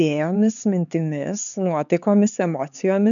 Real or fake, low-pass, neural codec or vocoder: fake; 7.2 kHz; codec, 16 kHz, 4 kbps, X-Codec, HuBERT features, trained on LibriSpeech